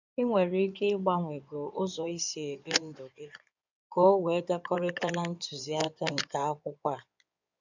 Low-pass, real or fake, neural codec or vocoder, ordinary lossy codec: 7.2 kHz; fake; codec, 16 kHz in and 24 kHz out, 2.2 kbps, FireRedTTS-2 codec; none